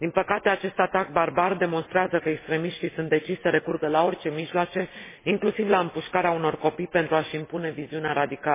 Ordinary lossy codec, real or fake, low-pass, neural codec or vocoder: MP3, 16 kbps; real; 3.6 kHz; none